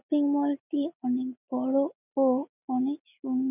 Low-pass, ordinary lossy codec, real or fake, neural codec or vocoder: 3.6 kHz; none; real; none